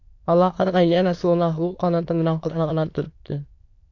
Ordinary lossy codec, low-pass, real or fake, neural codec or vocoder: AAC, 48 kbps; 7.2 kHz; fake; autoencoder, 22.05 kHz, a latent of 192 numbers a frame, VITS, trained on many speakers